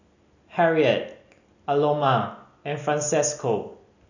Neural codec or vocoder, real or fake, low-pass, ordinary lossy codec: none; real; 7.2 kHz; none